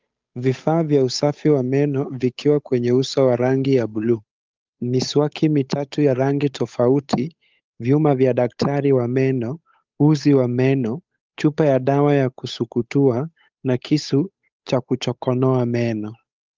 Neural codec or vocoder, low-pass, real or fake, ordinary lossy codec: codec, 16 kHz, 8 kbps, FunCodec, trained on Chinese and English, 25 frames a second; 7.2 kHz; fake; Opus, 24 kbps